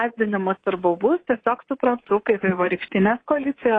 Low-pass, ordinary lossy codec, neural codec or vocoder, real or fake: 9.9 kHz; AAC, 32 kbps; codec, 24 kHz, 3.1 kbps, DualCodec; fake